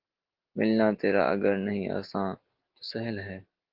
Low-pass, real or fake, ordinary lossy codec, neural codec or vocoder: 5.4 kHz; real; Opus, 16 kbps; none